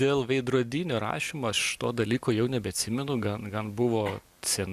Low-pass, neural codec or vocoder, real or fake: 14.4 kHz; none; real